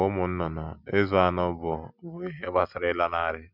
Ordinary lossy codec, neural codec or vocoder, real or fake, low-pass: none; none; real; 5.4 kHz